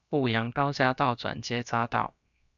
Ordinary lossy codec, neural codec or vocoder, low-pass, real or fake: MP3, 96 kbps; codec, 16 kHz, about 1 kbps, DyCAST, with the encoder's durations; 7.2 kHz; fake